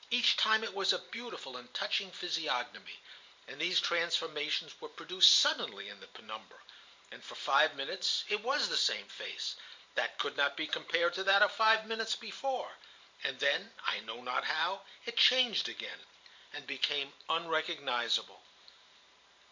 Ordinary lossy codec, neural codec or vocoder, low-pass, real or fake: MP3, 64 kbps; vocoder, 44.1 kHz, 128 mel bands every 512 samples, BigVGAN v2; 7.2 kHz; fake